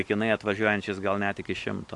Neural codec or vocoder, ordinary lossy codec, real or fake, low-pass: none; AAC, 64 kbps; real; 10.8 kHz